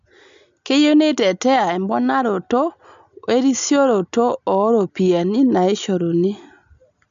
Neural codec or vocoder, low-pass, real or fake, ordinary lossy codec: none; 7.2 kHz; real; MP3, 64 kbps